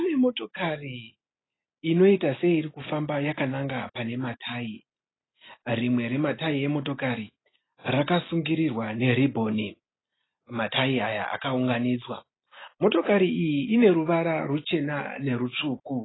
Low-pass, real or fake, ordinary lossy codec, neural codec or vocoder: 7.2 kHz; real; AAC, 16 kbps; none